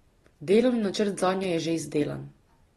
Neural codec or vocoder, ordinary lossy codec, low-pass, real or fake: none; AAC, 32 kbps; 19.8 kHz; real